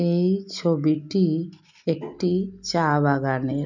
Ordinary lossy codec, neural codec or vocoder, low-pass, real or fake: none; none; 7.2 kHz; real